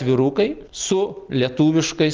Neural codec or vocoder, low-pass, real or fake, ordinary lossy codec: none; 7.2 kHz; real; Opus, 32 kbps